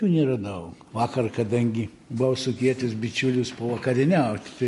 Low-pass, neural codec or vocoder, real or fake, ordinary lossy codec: 14.4 kHz; none; real; MP3, 48 kbps